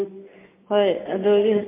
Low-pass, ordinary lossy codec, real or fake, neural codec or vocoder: 3.6 kHz; MP3, 24 kbps; real; none